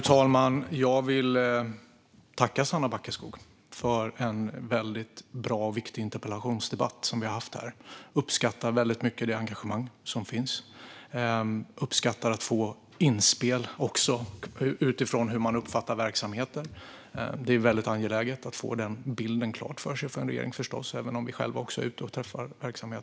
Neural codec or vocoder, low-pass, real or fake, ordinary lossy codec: none; none; real; none